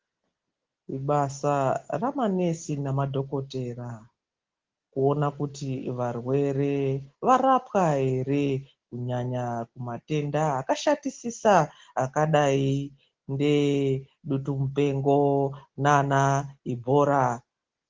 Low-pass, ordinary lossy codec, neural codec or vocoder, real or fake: 7.2 kHz; Opus, 16 kbps; none; real